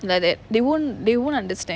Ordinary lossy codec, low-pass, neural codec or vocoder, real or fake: none; none; none; real